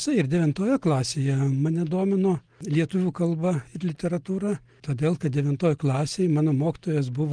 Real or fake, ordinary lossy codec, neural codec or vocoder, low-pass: real; Opus, 24 kbps; none; 9.9 kHz